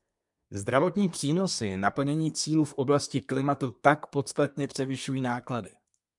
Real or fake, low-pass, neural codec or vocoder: fake; 10.8 kHz; codec, 24 kHz, 1 kbps, SNAC